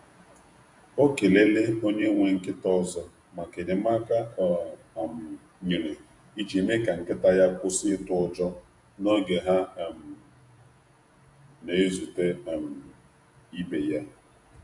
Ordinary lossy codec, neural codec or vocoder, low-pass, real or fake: AAC, 64 kbps; vocoder, 48 kHz, 128 mel bands, Vocos; 10.8 kHz; fake